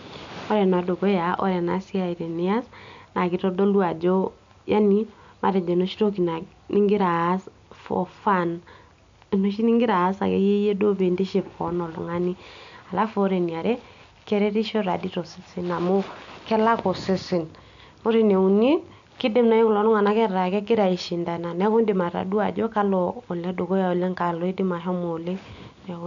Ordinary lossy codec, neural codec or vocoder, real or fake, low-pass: AAC, 64 kbps; none; real; 7.2 kHz